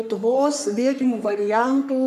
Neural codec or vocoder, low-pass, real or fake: codec, 44.1 kHz, 3.4 kbps, Pupu-Codec; 14.4 kHz; fake